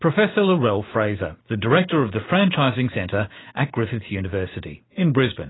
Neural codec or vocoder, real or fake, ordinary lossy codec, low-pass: none; real; AAC, 16 kbps; 7.2 kHz